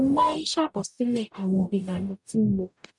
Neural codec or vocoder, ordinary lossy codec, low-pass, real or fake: codec, 44.1 kHz, 0.9 kbps, DAC; none; 10.8 kHz; fake